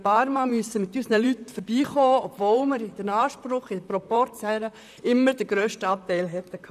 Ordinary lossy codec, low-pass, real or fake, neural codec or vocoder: none; 14.4 kHz; fake; vocoder, 44.1 kHz, 128 mel bands, Pupu-Vocoder